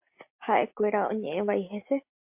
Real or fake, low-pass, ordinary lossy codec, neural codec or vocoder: real; 3.6 kHz; MP3, 32 kbps; none